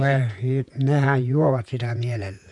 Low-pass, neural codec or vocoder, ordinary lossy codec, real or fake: 10.8 kHz; vocoder, 48 kHz, 128 mel bands, Vocos; none; fake